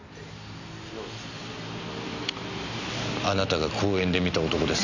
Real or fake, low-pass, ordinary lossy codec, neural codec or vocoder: real; 7.2 kHz; none; none